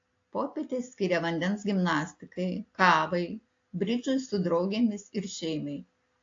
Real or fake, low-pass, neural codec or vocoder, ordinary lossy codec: real; 7.2 kHz; none; AAC, 48 kbps